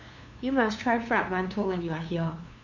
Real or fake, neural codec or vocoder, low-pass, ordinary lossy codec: fake; codec, 16 kHz, 2 kbps, FunCodec, trained on LibriTTS, 25 frames a second; 7.2 kHz; none